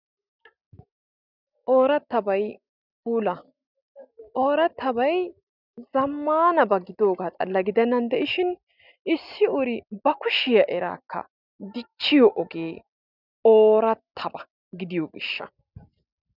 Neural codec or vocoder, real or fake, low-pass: none; real; 5.4 kHz